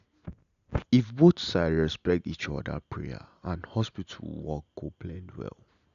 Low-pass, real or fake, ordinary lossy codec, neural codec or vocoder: 7.2 kHz; real; none; none